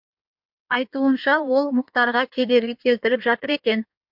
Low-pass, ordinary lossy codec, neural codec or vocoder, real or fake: 5.4 kHz; MP3, 48 kbps; codec, 16 kHz in and 24 kHz out, 1.1 kbps, FireRedTTS-2 codec; fake